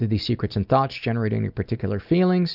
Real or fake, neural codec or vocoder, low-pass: real; none; 5.4 kHz